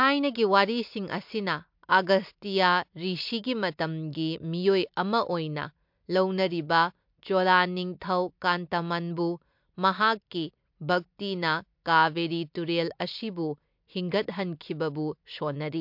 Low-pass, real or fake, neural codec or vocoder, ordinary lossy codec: 5.4 kHz; real; none; MP3, 48 kbps